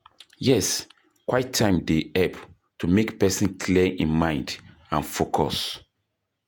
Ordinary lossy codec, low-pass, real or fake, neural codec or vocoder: none; none; fake; vocoder, 48 kHz, 128 mel bands, Vocos